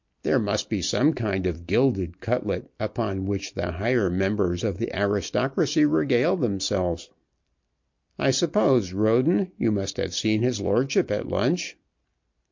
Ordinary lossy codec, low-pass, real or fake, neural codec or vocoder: MP3, 48 kbps; 7.2 kHz; real; none